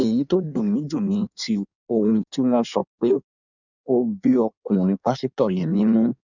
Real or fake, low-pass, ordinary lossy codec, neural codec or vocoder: fake; 7.2 kHz; none; codec, 16 kHz in and 24 kHz out, 1.1 kbps, FireRedTTS-2 codec